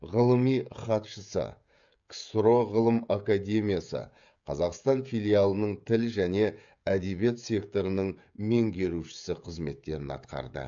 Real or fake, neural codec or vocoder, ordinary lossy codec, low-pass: fake; codec, 16 kHz, 16 kbps, FreqCodec, smaller model; AAC, 64 kbps; 7.2 kHz